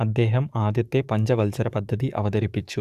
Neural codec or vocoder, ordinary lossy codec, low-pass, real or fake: codec, 44.1 kHz, 7.8 kbps, DAC; Opus, 64 kbps; 14.4 kHz; fake